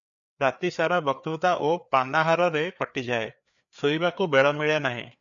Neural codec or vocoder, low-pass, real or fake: codec, 16 kHz, 4 kbps, FreqCodec, larger model; 7.2 kHz; fake